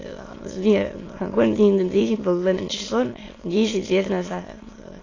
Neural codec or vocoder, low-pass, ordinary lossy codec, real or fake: autoencoder, 22.05 kHz, a latent of 192 numbers a frame, VITS, trained on many speakers; 7.2 kHz; AAC, 32 kbps; fake